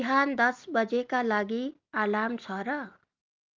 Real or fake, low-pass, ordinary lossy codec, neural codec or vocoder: real; 7.2 kHz; Opus, 24 kbps; none